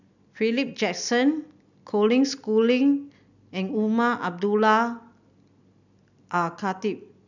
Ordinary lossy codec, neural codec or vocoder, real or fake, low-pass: none; none; real; 7.2 kHz